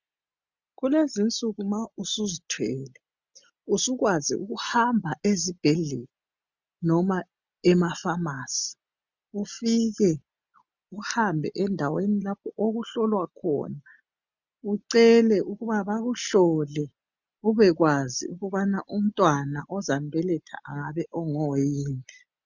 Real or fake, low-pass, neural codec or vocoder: real; 7.2 kHz; none